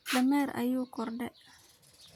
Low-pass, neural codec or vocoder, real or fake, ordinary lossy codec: 19.8 kHz; none; real; none